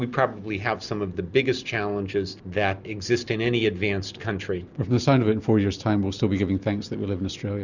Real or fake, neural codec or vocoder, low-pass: real; none; 7.2 kHz